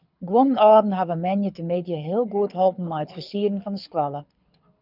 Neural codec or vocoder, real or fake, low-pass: codec, 24 kHz, 6 kbps, HILCodec; fake; 5.4 kHz